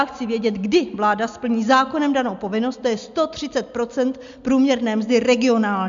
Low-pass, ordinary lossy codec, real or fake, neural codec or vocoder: 7.2 kHz; MP3, 64 kbps; real; none